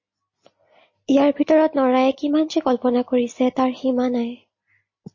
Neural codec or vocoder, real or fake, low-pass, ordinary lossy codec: none; real; 7.2 kHz; MP3, 32 kbps